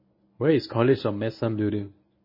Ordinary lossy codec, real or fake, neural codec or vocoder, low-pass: MP3, 24 kbps; fake; codec, 24 kHz, 0.9 kbps, WavTokenizer, medium speech release version 1; 5.4 kHz